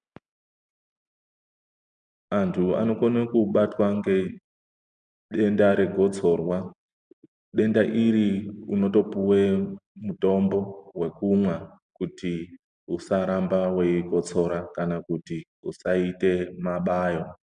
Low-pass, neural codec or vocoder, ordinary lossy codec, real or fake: 9.9 kHz; none; MP3, 96 kbps; real